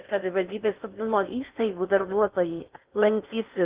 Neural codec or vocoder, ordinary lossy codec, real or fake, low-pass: codec, 16 kHz in and 24 kHz out, 0.6 kbps, FocalCodec, streaming, 4096 codes; Opus, 32 kbps; fake; 3.6 kHz